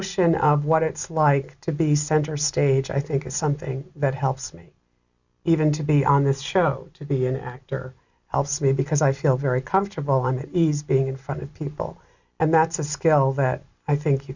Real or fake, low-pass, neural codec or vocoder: real; 7.2 kHz; none